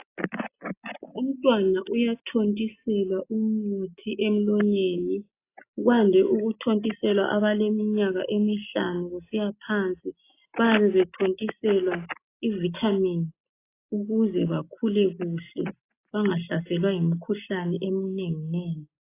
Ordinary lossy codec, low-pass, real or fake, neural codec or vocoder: AAC, 24 kbps; 3.6 kHz; real; none